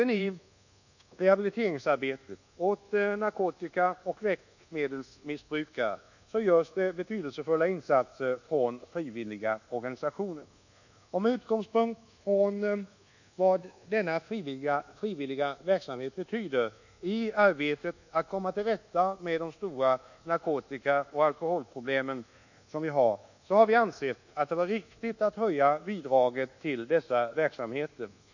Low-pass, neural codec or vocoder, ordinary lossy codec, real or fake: 7.2 kHz; codec, 24 kHz, 1.2 kbps, DualCodec; none; fake